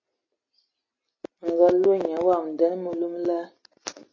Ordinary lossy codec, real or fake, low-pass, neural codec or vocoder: MP3, 32 kbps; real; 7.2 kHz; none